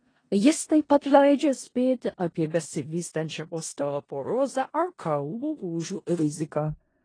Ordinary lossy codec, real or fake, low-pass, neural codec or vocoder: AAC, 32 kbps; fake; 9.9 kHz; codec, 16 kHz in and 24 kHz out, 0.4 kbps, LongCat-Audio-Codec, four codebook decoder